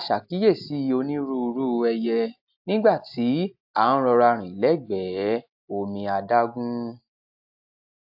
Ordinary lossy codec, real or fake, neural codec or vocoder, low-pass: AAC, 48 kbps; real; none; 5.4 kHz